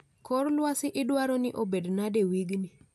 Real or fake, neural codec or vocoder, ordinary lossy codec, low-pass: real; none; MP3, 96 kbps; 14.4 kHz